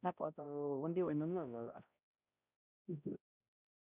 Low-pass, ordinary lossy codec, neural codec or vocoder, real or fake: 3.6 kHz; none; codec, 16 kHz, 0.5 kbps, X-Codec, HuBERT features, trained on balanced general audio; fake